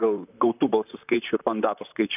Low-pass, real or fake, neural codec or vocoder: 3.6 kHz; fake; vocoder, 44.1 kHz, 128 mel bands, Pupu-Vocoder